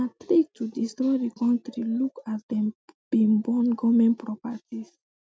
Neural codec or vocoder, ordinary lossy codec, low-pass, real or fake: none; none; none; real